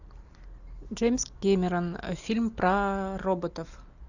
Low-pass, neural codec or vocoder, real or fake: 7.2 kHz; none; real